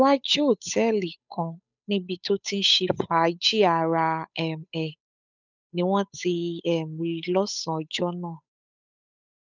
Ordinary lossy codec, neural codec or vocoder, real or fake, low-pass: none; codec, 16 kHz, 8 kbps, FunCodec, trained on Chinese and English, 25 frames a second; fake; 7.2 kHz